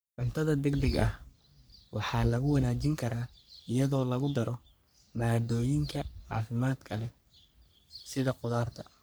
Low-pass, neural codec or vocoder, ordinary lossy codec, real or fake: none; codec, 44.1 kHz, 3.4 kbps, Pupu-Codec; none; fake